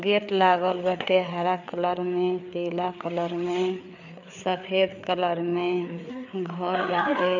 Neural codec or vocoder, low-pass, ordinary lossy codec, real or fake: codec, 16 kHz, 4 kbps, FreqCodec, larger model; 7.2 kHz; none; fake